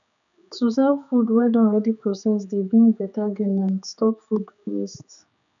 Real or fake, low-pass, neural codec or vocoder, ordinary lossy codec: fake; 7.2 kHz; codec, 16 kHz, 4 kbps, X-Codec, HuBERT features, trained on general audio; none